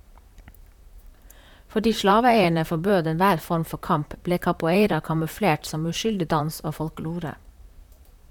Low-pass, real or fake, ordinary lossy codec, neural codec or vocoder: 19.8 kHz; fake; none; vocoder, 44.1 kHz, 128 mel bands, Pupu-Vocoder